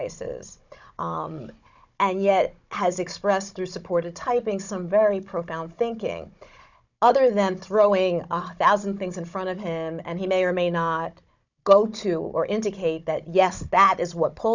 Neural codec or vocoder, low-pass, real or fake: codec, 16 kHz, 16 kbps, FunCodec, trained on Chinese and English, 50 frames a second; 7.2 kHz; fake